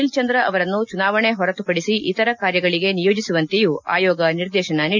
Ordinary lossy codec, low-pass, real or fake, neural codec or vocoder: none; 7.2 kHz; real; none